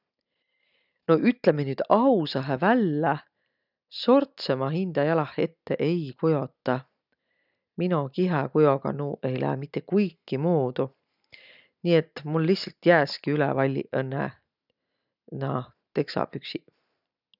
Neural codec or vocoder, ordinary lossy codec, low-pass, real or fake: none; none; 5.4 kHz; real